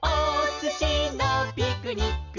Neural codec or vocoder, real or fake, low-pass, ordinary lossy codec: none; real; 7.2 kHz; none